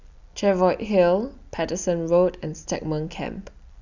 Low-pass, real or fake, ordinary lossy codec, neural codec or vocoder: 7.2 kHz; real; none; none